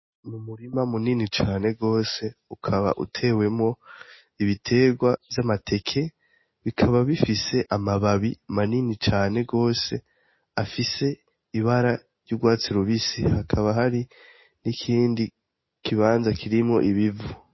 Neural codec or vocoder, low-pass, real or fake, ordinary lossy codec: none; 7.2 kHz; real; MP3, 24 kbps